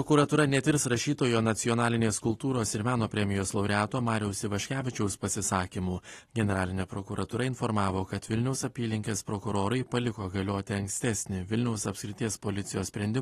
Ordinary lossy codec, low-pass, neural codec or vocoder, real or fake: AAC, 32 kbps; 19.8 kHz; none; real